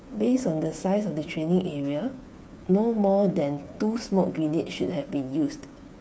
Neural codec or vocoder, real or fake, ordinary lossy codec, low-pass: codec, 16 kHz, 8 kbps, FreqCodec, smaller model; fake; none; none